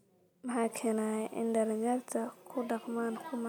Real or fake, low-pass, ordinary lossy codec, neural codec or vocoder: real; none; none; none